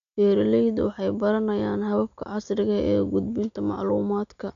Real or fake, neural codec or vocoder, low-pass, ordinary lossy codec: real; none; 7.2 kHz; none